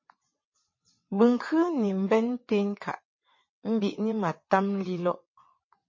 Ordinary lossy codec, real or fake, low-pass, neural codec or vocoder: MP3, 32 kbps; fake; 7.2 kHz; vocoder, 22.05 kHz, 80 mel bands, WaveNeXt